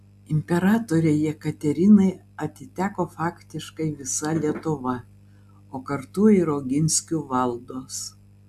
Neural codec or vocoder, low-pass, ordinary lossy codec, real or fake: none; 14.4 kHz; AAC, 96 kbps; real